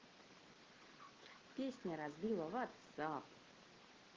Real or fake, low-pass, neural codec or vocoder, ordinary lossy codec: real; 7.2 kHz; none; Opus, 16 kbps